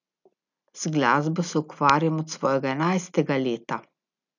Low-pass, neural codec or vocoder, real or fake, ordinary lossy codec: 7.2 kHz; none; real; none